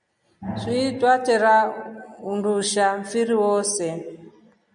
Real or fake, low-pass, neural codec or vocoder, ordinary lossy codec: real; 9.9 kHz; none; MP3, 96 kbps